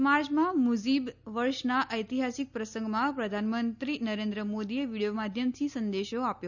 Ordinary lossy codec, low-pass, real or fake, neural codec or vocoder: none; 7.2 kHz; real; none